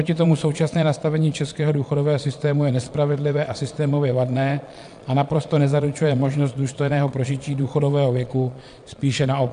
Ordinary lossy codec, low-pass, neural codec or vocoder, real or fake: AAC, 64 kbps; 9.9 kHz; vocoder, 22.05 kHz, 80 mel bands, Vocos; fake